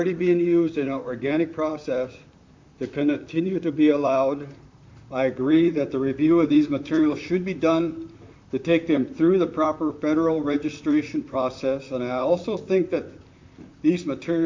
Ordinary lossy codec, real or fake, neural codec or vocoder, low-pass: MP3, 64 kbps; fake; vocoder, 44.1 kHz, 128 mel bands, Pupu-Vocoder; 7.2 kHz